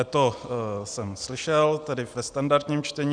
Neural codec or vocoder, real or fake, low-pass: none; real; 9.9 kHz